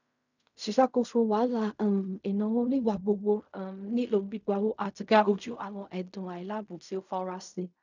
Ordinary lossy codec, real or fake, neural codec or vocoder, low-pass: none; fake; codec, 16 kHz in and 24 kHz out, 0.4 kbps, LongCat-Audio-Codec, fine tuned four codebook decoder; 7.2 kHz